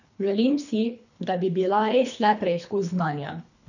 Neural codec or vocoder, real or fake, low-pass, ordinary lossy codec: codec, 24 kHz, 3 kbps, HILCodec; fake; 7.2 kHz; none